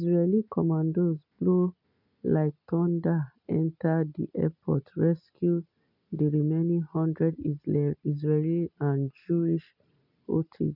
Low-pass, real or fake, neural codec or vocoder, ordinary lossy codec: 5.4 kHz; real; none; none